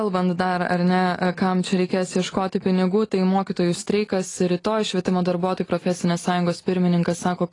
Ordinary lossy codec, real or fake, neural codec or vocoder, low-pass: AAC, 32 kbps; real; none; 10.8 kHz